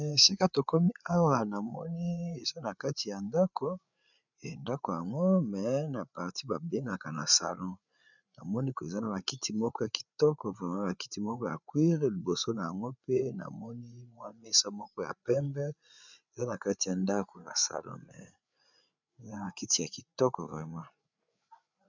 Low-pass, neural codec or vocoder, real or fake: 7.2 kHz; codec, 16 kHz, 8 kbps, FreqCodec, larger model; fake